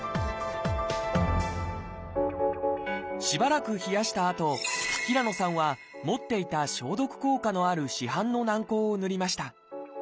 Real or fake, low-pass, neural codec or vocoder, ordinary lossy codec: real; none; none; none